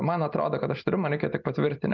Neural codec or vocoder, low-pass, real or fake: none; 7.2 kHz; real